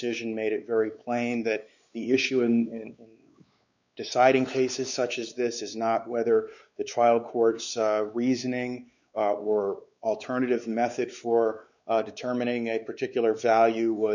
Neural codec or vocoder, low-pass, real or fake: codec, 16 kHz, 4 kbps, X-Codec, WavLM features, trained on Multilingual LibriSpeech; 7.2 kHz; fake